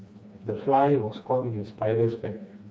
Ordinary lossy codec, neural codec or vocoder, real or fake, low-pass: none; codec, 16 kHz, 2 kbps, FreqCodec, smaller model; fake; none